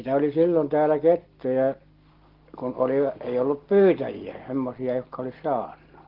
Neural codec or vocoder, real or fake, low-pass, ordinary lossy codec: none; real; 5.4 kHz; Opus, 16 kbps